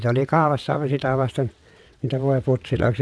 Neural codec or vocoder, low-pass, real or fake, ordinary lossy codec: vocoder, 22.05 kHz, 80 mel bands, WaveNeXt; none; fake; none